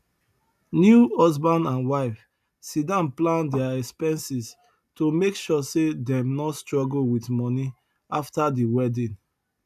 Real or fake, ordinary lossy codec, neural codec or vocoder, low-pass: real; none; none; 14.4 kHz